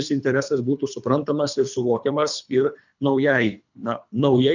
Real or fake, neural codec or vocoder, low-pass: fake; codec, 24 kHz, 3 kbps, HILCodec; 7.2 kHz